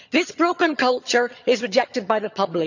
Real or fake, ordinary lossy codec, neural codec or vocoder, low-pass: fake; none; vocoder, 22.05 kHz, 80 mel bands, HiFi-GAN; 7.2 kHz